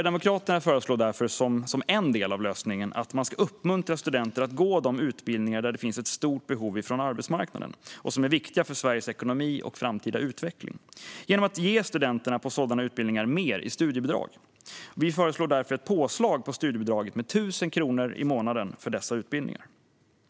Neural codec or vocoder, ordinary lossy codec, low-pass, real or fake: none; none; none; real